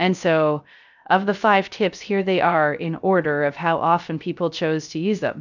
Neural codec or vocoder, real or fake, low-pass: codec, 16 kHz, 0.3 kbps, FocalCodec; fake; 7.2 kHz